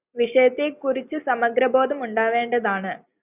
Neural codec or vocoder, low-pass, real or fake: none; 3.6 kHz; real